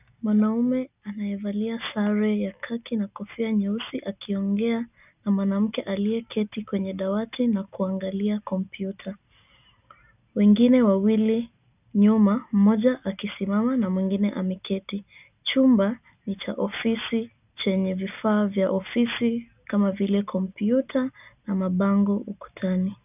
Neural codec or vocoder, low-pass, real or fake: none; 3.6 kHz; real